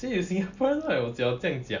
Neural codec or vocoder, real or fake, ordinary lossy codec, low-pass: none; real; none; 7.2 kHz